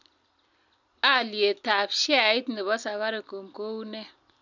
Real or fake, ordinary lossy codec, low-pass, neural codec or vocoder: real; none; 7.2 kHz; none